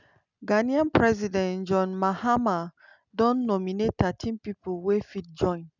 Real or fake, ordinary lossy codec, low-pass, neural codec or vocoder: real; none; 7.2 kHz; none